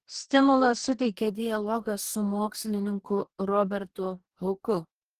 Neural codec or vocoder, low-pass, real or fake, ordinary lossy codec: codec, 44.1 kHz, 2.6 kbps, DAC; 14.4 kHz; fake; Opus, 16 kbps